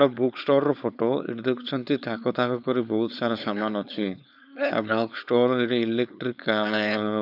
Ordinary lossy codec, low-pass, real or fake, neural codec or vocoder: none; 5.4 kHz; fake; codec, 16 kHz, 4.8 kbps, FACodec